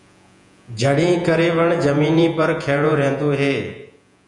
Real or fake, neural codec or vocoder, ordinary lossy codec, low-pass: fake; vocoder, 48 kHz, 128 mel bands, Vocos; MP3, 96 kbps; 10.8 kHz